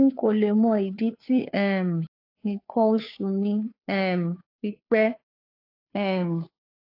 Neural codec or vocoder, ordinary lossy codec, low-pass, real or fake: codec, 16 kHz, 2 kbps, FunCodec, trained on Chinese and English, 25 frames a second; AAC, 32 kbps; 5.4 kHz; fake